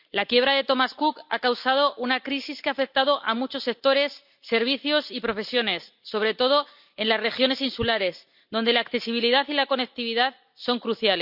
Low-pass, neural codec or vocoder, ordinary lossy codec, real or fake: 5.4 kHz; none; none; real